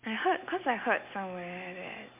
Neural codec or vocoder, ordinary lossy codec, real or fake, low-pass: none; MP3, 32 kbps; real; 3.6 kHz